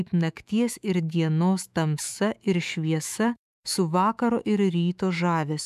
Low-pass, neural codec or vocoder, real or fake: 14.4 kHz; autoencoder, 48 kHz, 128 numbers a frame, DAC-VAE, trained on Japanese speech; fake